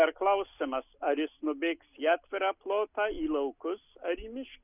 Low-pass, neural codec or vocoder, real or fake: 3.6 kHz; none; real